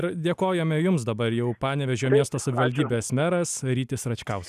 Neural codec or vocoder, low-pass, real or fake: none; 14.4 kHz; real